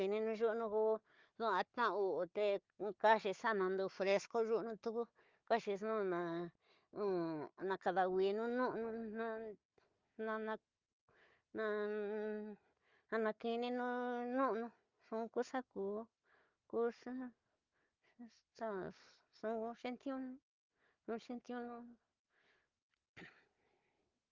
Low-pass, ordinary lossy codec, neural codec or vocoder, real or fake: 7.2 kHz; Opus, 32 kbps; codec, 16 kHz, 4 kbps, FunCodec, trained on Chinese and English, 50 frames a second; fake